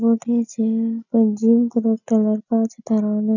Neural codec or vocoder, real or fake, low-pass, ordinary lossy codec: none; real; 7.2 kHz; none